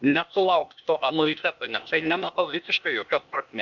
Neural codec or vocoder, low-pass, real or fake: codec, 16 kHz, 0.8 kbps, ZipCodec; 7.2 kHz; fake